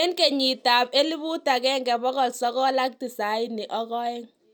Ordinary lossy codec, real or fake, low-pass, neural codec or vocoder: none; real; none; none